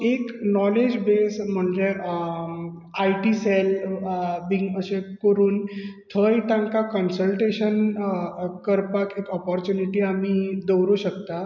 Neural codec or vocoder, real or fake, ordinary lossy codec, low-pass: none; real; none; 7.2 kHz